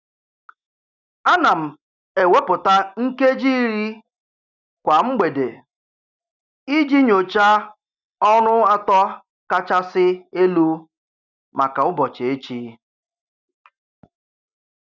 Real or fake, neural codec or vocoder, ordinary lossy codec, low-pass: real; none; none; 7.2 kHz